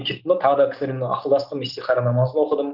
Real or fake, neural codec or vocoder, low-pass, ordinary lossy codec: real; none; 5.4 kHz; Opus, 24 kbps